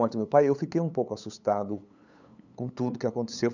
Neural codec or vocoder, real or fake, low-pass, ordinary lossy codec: codec, 16 kHz, 8 kbps, FunCodec, trained on LibriTTS, 25 frames a second; fake; 7.2 kHz; none